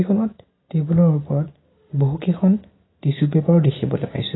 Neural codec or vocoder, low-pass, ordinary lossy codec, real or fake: none; 7.2 kHz; AAC, 16 kbps; real